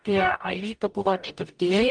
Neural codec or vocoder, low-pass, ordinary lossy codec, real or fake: codec, 44.1 kHz, 0.9 kbps, DAC; 9.9 kHz; Opus, 32 kbps; fake